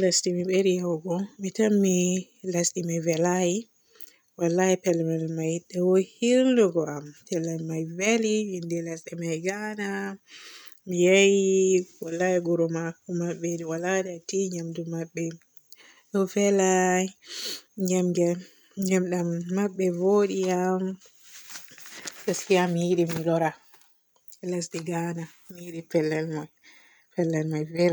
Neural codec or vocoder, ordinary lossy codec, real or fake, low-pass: none; none; real; none